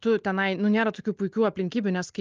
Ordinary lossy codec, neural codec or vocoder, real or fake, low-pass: Opus, 24 kbps; none; real; 7.2 kHz